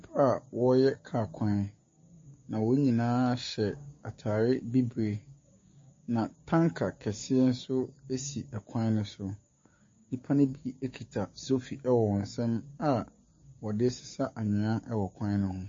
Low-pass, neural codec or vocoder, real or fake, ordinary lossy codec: 7.2 kHz; codec, 16 kHz, 6 kbps, DAC; fake; MP3, 32 kbps